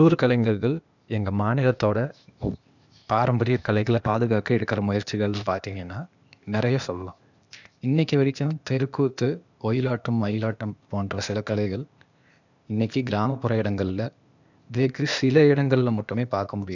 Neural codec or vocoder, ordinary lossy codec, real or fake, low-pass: codec, 16 kHz, 0.8 kbps, ZipCodec; none; fake; 7.2 kHz